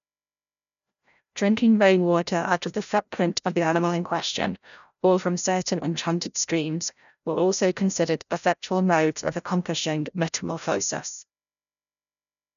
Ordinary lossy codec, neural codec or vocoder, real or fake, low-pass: none; codec, 16 kHz, 0.5 kbps, FreqCodec, larger model; fake; 7.2 kHz